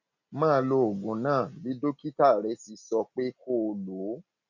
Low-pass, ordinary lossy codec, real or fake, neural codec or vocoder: 7.2 kHz; none; real; none